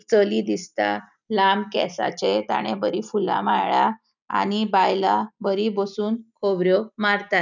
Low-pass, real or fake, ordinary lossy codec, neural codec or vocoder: 7.2 kHz; real; none; none